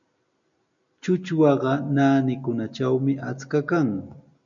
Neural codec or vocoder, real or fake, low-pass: none; real; 7.2 kHz